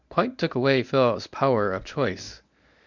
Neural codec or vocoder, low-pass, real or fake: codec, 24 kHz, 0.9 kbps, WavTokenizer, medium speech release version 1; 7.2 kHz; fake